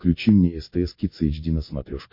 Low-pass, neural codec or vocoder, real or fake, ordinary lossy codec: 5.4 kHz; none; real; MP3, 32 kbps